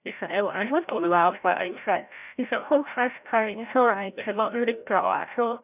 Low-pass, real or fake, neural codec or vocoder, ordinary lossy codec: 3.6 kHz; fake; codec, 16 kHz, 0.5 kbps, FreqCodec, larger model; none